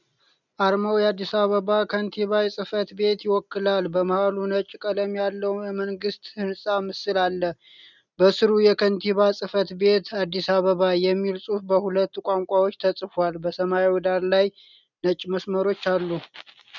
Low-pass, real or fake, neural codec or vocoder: 7.2 kHz; real; none